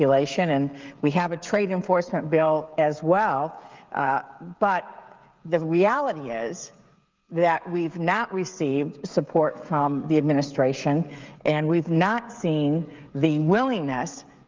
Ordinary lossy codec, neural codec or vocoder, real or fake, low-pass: Opus, 24 kbps; codec, 16 kHz, 16 kbps, FreqCodec, smaller model; fake; 7.2 kHz